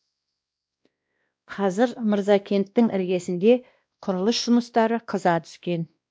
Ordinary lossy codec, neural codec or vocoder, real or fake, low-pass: none; codec, 16 kHz, 1 kbps, X-Codec, WavLM features, trained on Multilingual LibriSpeech; fake; none